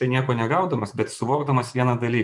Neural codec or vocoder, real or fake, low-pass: vocoder, 24 kHz, 100 mel bands, Vocos; fake; 10.8 kHz